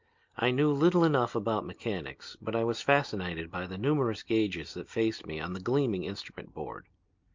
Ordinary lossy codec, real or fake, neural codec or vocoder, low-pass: Opus, 24 kbps; real; none; 7.2 kHz